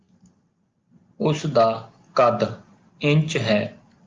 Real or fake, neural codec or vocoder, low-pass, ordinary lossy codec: real; none; 7.2 kHz; Opus, 24 kbps